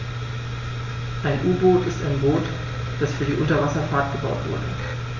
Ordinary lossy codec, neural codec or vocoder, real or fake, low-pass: MP3, 32 kbps; none; real; 7.2 kHz